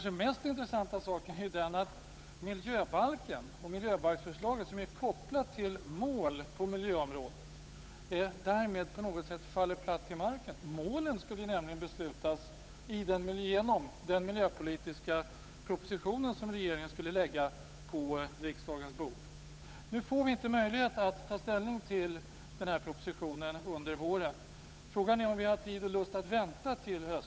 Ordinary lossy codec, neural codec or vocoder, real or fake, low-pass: none; codec, 16 kHz, 8 kbps, FunCodec, trained on Chinese and English, 25 frames a second; fake; none